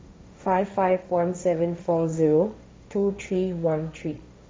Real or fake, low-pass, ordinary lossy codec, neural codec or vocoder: fake; none; none; codec, 16 kHz, 1.1 kbps, Voila-Tokenizer